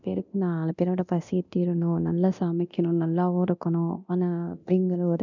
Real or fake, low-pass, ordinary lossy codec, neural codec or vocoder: fake; 7.2 kHz; none; codec, 24 kHz, 0.9 kbps, DualCodec